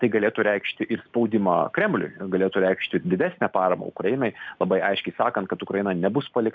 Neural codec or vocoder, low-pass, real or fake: none; 7.2 kHz; real